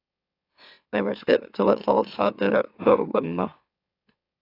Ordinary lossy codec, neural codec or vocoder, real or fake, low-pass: AAC, 32 kbps; autoencoder, 44.1 kHz, a latent of 192 numbers a frame, MeloTTS; fake; 5.4 kHz